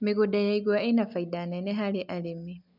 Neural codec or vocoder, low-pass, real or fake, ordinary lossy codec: none; 5.4 kHz; real; none